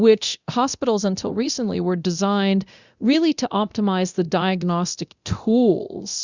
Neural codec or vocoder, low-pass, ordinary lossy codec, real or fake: codec, 24 kHz, 0.9 kbps, DualCodec; 7.2 kHz; Opus, 64 kbps; fake